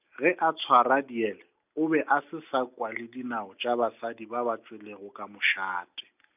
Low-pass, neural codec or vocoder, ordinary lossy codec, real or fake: 3.6 kHz; none; none; real